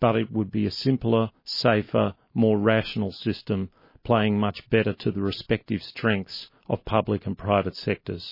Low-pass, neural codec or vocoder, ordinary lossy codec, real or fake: 5.4 kHz; none; MP3, 24 kbps; real